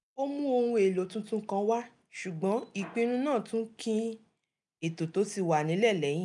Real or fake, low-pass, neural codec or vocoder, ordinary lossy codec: real; 10.8 kHz; none; none